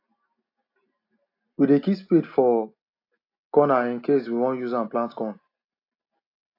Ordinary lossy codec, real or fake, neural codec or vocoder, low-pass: AAC, 32 kbps; real; none; 5.4 kHz